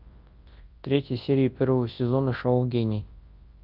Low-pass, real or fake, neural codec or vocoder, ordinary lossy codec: 5.4 kHz; fake; codec, 24 kHz, 0.9 kbps, WavTokenizer, large speech release; Opus, 24 kbps